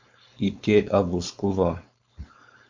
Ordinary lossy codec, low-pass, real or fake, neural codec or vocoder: MP3, 64 kbps; 7.2 kHz; fake; codec, 16 kHz, 4.8 kbps, FACodec